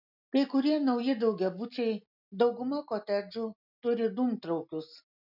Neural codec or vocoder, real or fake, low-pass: none; real; 5.4 kHz